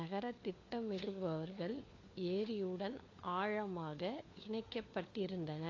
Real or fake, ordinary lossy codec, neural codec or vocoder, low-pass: fake; AAC, 32 kbps; codec, 16 kHz, 4 kbps, FunCodec, trained on LibriTTS, 50 frames a second; 7.2 kHz